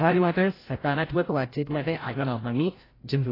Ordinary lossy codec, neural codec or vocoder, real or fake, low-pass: AAC, 24 kbps; codec, 16 kHz, 0.5 kbps, FreqCodec, larger model; fake; 5.4 kHz